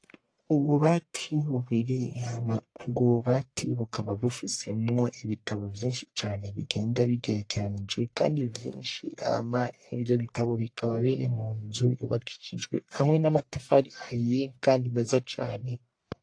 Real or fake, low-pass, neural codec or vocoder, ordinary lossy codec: fake; 9.9 kHz; codec, 44.1 kHz, 1.7 kbps, Pupu-Codec; AAC, 48 kbps